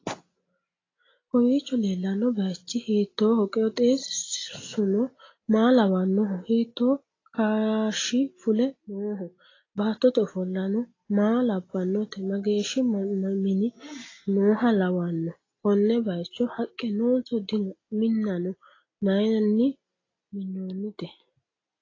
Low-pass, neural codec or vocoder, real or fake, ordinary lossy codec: 7.2 kHz; none; real; AAC, 32 kbps